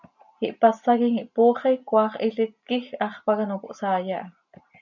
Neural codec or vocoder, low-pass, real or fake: none; 7.2 kHz; real